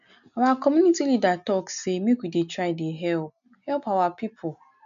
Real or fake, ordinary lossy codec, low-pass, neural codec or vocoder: real; none; 7.2 kHz; none